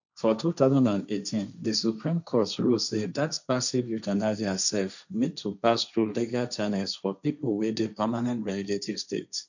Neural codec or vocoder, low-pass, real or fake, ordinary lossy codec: codec, 16 kHz, 1.1 kbps, Voila-Tokenizer; 7.2 kHz; fake; none